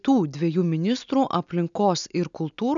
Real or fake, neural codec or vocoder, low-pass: real; none; 7.2 kHz